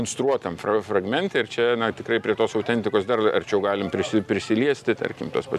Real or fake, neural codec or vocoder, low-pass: real; none; 14.4 kHz